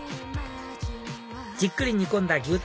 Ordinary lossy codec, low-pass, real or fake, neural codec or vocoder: none; none; real; none